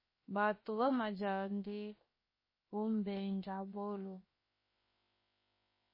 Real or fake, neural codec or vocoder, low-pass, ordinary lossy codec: fake; codec, 16 kHz, 0.7 kbps, FocalCodec; 5.4 kHz; MP3, 24 kbps